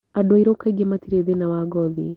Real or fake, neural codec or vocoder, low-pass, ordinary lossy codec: real; none; 19.8 kHz; Opus, 16 kbps